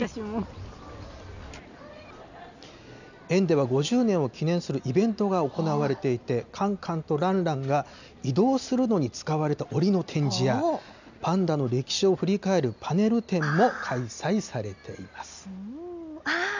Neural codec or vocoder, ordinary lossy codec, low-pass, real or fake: none; none; 7.2 kHz; real